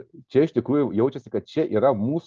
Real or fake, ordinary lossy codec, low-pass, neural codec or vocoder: real; Opus, 24 kbps; 7.2 kHz; none